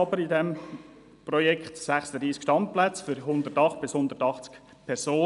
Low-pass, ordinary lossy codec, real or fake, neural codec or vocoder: 10.8 kHz; none; real; none